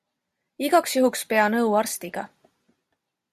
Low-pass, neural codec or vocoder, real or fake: 14.4 kHz; none; real